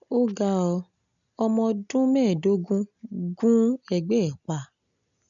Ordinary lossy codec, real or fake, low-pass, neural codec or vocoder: none; real; 7.2 kHz; none